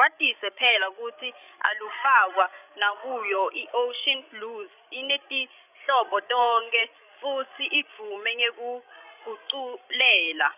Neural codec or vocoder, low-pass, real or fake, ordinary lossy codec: codec, 16 kHz, 16 kbps, FreqCodec, larger model; 3.6 kHz; fake; none